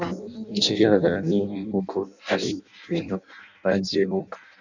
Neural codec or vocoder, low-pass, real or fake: codec, 16 kHz in and 24 kHz out, 0.6 kbps, FireRedTTS-2 codec; 7.2 kHz; fake